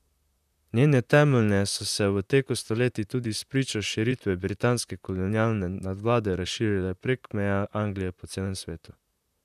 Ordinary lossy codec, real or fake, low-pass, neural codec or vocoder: none; fake; 14.4 kHz; vocoder, 44.1 kHz, 128 mel bands, Pupu-Vocoder